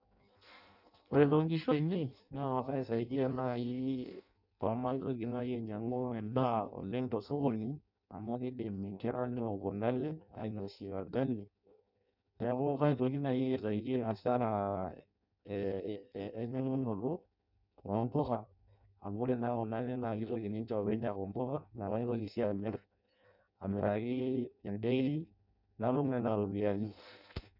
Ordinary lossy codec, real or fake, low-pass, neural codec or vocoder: none; fake; 5.4 kHz; codec, 16 kHz in and 24 kHz out, 0.6 kbps, FireRedTTS-2 codec